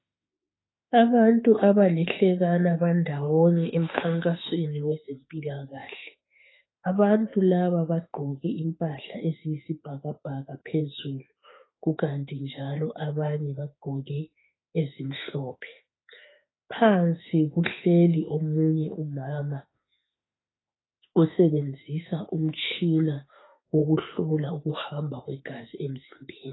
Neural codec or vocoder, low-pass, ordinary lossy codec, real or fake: autoencoder, 48 kHz, 32 numbers a frame, DAC-VAE, trained on Japanese speech; 7.2 kHz; AAC, 16 kbps; fake